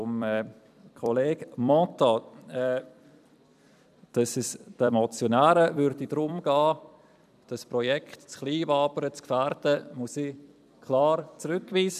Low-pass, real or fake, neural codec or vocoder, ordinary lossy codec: 14.4 kHz; fake; vocoder, 44.1 kHz, 128 mel bands every 256 samples, BigVGAN v2; none